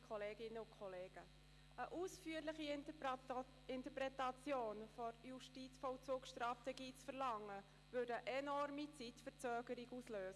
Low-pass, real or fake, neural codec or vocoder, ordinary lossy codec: none; real; none; none